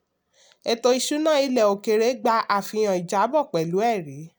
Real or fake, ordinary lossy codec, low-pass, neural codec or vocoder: real; none; none; none